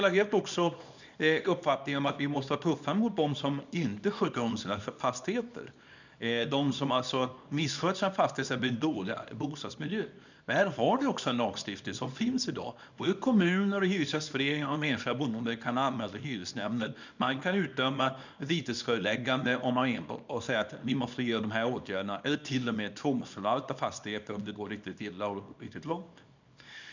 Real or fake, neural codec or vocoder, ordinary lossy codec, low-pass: fake; codec, 24 kHz, 0.9 kbps, WavTokenizer, small release; none; 7.2 kHz